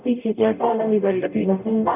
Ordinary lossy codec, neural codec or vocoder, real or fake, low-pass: none; codec, 44.1 kHz, 0.9 kbps, DAC; fake; 3.6 kHz